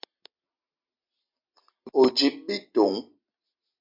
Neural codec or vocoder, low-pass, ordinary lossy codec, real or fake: none; 5.4 kHz; MP3, 48 kbps; real